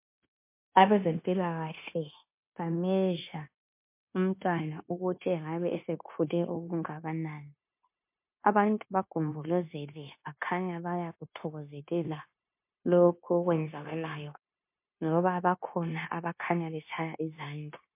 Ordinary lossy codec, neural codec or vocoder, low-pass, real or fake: MP3, 24 kbps; codec, 16 kHz, 0.9 kbps, LongCat-Audio-Codec; 3.6 kHz; fake